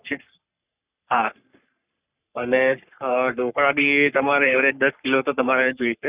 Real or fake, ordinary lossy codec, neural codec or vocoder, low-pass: fake; Opus, 64 kbps; codec, 44.1 kHz, 3.4 kbps, Pupu-Codec; 3.6 kHz